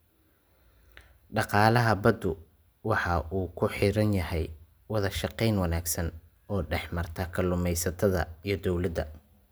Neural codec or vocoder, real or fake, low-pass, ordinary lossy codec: none; real; none; none